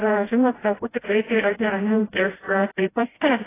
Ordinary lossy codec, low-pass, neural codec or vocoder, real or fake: AAC, 16 kbps; 3.6 kHz; codec, 16 kHz, 0.5 kbps, FreqCodec, smaller model; fake